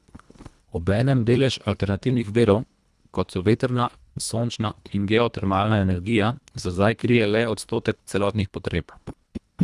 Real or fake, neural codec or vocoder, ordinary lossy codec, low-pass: fake; codec, 24 kHz, 1.5 kbps, HILCodec; none; none